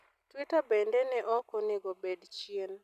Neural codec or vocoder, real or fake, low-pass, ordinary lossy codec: none; real; 14.4 kHz; none